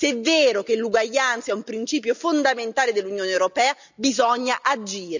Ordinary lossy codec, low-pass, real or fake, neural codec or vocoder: none; 7.2 kHz; real; none